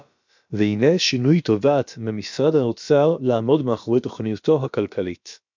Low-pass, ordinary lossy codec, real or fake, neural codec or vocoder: 7.2 kHz; MP3, 64 kbps; fake; codec, 16 kHz, about 1 kbps, DyCAST, with the encoder's durations